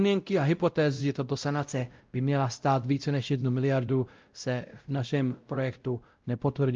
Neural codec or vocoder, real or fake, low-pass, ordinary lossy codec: codec, 16 kHz, 0.5 kbps, X-Codec, WavLM features, trained on Multilingual LibriSpeech; fake; 7.2 kHz; Opus, 24 kbps